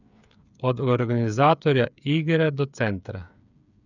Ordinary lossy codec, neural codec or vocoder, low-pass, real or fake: none; codec, 16 kHz, 8 kbps, FreqCodec, smaller model; 7.2 kHz; fake